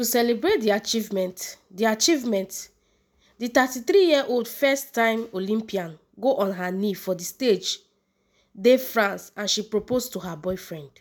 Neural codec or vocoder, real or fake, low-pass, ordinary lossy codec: none; real; none; none